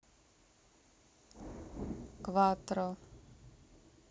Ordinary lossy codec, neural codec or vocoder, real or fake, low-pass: none; none; real; none